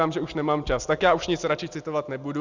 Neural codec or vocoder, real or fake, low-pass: none; real; 7.2 kHz